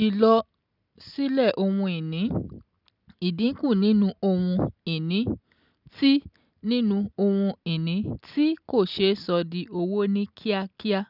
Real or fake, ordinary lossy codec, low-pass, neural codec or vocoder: real; none; 5.4 kHz; none